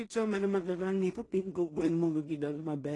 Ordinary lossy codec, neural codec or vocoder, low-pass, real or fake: AAC, 32 kbps; codec, 16 kHz in and 24 kHz out, 0.4 kbps, LongCat-Audio-Codec, two codebook decoder; 10.8 kHz; fake